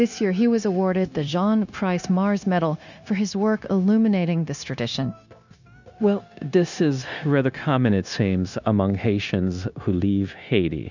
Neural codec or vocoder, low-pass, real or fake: codec, 16 kHz, 0.9 kbps, LongCat-Audio-Codec; 7.2 kHz; fake